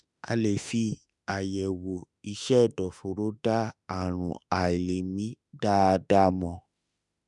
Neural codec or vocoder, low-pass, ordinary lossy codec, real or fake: autoencoder, 48 kHz, 32 numbers a frame, DAC-VAE, trained on Japanese speech; 10.8 kHz; none; fake